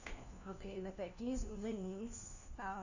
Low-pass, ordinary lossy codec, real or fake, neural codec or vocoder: 7.2 kHz; none; fake; codec, 16 kHz, 1 kbps, FunCodec, trained on LibriTTS, 50 frames a second